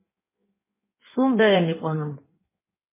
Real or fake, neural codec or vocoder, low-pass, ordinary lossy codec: fake; codec, 16 kHz in and 24 kHz out, 1.1 kbps, FireRedTTS-2 codec; 3.6 kHz; MP3, 16 kbps